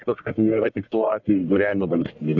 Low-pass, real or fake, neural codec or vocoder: 7.2 kHz; fake; codec, 44.1 kHz, 1.7 kbps, Pupu-Codec